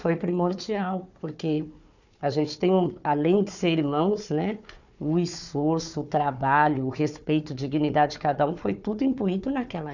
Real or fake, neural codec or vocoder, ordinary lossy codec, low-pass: fake; codec, 16 kHz, 4 kbps, FunCodec, trained on Chinese and English, 50 frames a second; none; 7.2 kHz